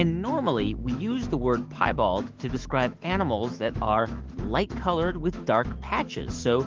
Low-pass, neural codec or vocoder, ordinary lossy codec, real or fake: 7.2 kHz; autoencoder, 48 kHz, 128 numbers a frame, DAC-VAE, trained on Japanese speech; Opus, 16 kbps; fake